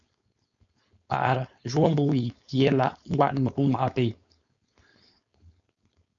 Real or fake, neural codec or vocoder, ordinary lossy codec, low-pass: fake; codec, 16 kHz, 4.8 kbps, FACodec; MP3, 96 kbps; 7.2 kHz